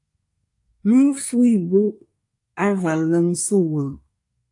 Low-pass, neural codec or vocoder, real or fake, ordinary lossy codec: 10.8 kHz; codec, 24 kHz, 1 kbps, SNAC; fake; AAC, 64 kbps